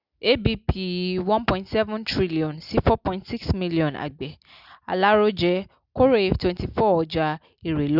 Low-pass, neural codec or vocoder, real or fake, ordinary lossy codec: 5.4 kHz; none; real; Opus, 64 kbps